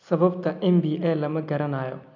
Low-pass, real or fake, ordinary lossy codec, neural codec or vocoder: 7.2 kHz; real; none; none